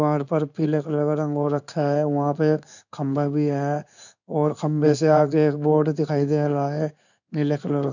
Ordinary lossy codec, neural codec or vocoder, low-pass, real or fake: none; codec, 16 kHz in and 24 kHz out, 1 kbps, XY-Tokenizer; 7.2 kHz; fake